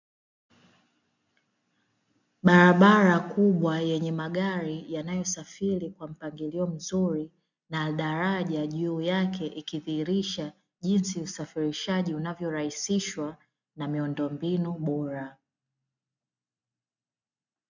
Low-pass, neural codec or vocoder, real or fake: 7.2 kHz; none; real